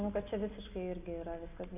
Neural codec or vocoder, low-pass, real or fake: none; 3.6 kHz; real